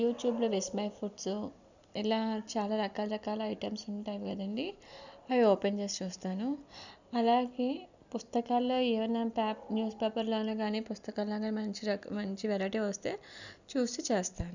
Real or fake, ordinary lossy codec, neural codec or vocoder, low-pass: real; none; none; 7.2 kHz